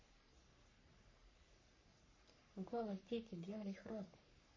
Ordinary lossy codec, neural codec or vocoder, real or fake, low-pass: MP3, 32 kbps; codec, 44.1 kHz, 3.4 kbps, Pupu-Codec; fake; 7.2 kHz